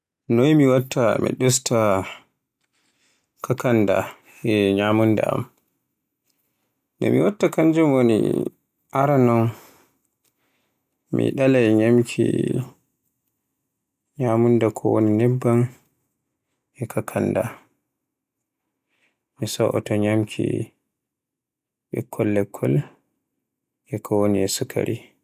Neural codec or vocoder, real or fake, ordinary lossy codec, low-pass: none; real; none; 14.4 kHz